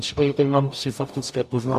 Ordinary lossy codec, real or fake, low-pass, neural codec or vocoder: MP3, 64 kbps; fake; 14.4 kHz; codec, 44.1 kHz, 0.9 kbps, DAC